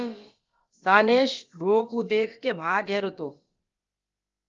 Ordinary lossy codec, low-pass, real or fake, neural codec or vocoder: Opus, 32 kbps; 7.2 kHz; fake; codec, 16 kHz, about 1 kbps, DyCAST, with the encoder's durations